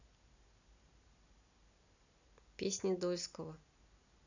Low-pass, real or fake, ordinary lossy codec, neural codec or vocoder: 7.2 kHz; real; none; none